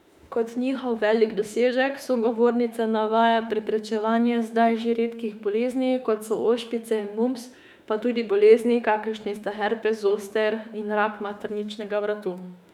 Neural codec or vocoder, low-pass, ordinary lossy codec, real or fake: autoencoder, 48 kHz, 32 numbers a frame, DAC-VAE, trained on Japanese speech; 19.8 kHz; none; fake